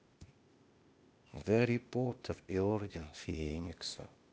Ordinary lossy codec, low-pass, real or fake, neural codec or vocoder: none; none; fake; codec, 16 kHz, 0.8 kbps, ZipCodec